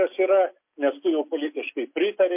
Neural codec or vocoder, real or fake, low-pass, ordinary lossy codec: none; real; 3.6 kHz; MP3, 32 kbps